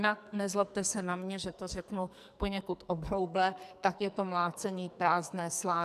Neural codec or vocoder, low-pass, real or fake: codec, 44.1 kHz, 2.6 kbps, SNAC; 14.4 kHz; fake